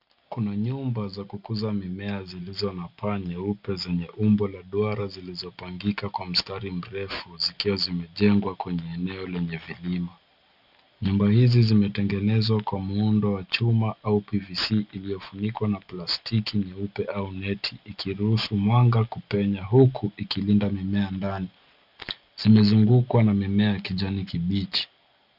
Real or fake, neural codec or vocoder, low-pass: real; none; 5.4 kHz